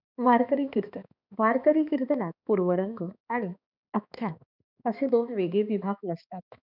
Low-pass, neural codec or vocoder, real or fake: 5.4 kHz; codec, 16 kHz, 2 kbps, X-Codec, HuBERT features, trained on balanced general audio; fake